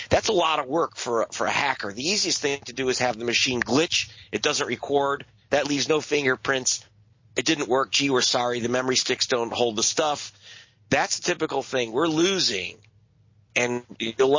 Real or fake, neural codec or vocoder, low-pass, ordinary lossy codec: real; none; 7.2 kHz; MP3, 32 kbps